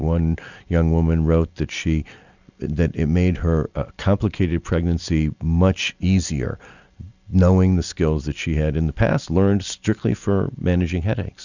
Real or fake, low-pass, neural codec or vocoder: real; 7.2 kHz; none